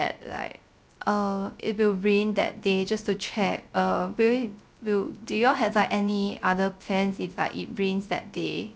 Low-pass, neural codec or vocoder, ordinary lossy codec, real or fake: none; codec, 16 kHz, 0.3 kbps, FocalCodec; none; fake